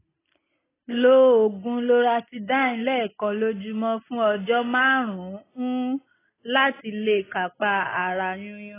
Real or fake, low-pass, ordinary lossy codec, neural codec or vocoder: real; 3.6 kHz; AAC, 16 kbps; none